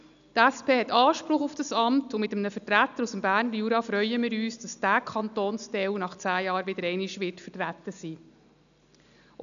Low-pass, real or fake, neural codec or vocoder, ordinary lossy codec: 7.2 kHz; real; none; none